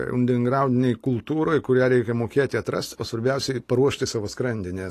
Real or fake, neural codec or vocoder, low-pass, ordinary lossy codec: real; none; 14.4 kHz; AAC, 48 kbps